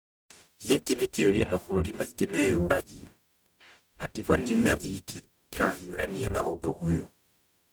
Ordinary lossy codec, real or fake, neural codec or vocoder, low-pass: none; fake; codec, 44.1 kHz, 0.9 kbps, DAC; none